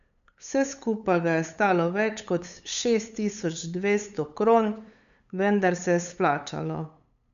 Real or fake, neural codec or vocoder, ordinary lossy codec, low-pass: fake; codec, 16 kHz, 8 kbps, FunCodec, trained on LibriTTS, 25 frames a second; none; 7.2 kHz